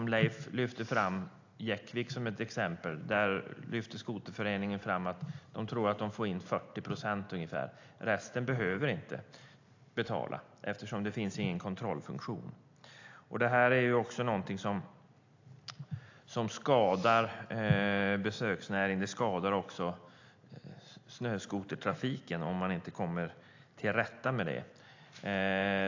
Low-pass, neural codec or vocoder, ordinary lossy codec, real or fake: 7.2 kHz; none; AAC, 48 kbps; real